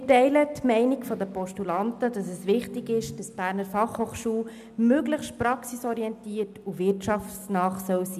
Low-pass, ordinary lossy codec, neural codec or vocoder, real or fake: 14.4 kHz; none; none; real